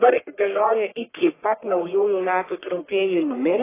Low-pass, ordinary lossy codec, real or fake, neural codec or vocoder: 3.6 kHz; AAC, 16 kbps; fake; codec, 44.1 kHz, 1.7 kbps, Pupu-Codec